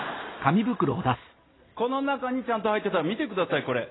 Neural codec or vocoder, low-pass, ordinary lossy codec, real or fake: none; 7.2 kHz; AAC, 16 kbps; real